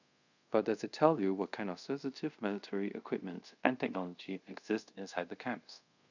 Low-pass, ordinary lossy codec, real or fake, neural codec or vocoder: 7.2 kHz; none; fake; codec, 24 kHz, 0.5 kbps, DualCodec